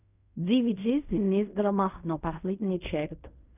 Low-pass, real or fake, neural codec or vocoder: 3.6 kHz; fake; codec, 16 kHz in and 24 kHz out, 0.4 kbps, LongCat-Audio-Codec, fine tuned four codebook decoder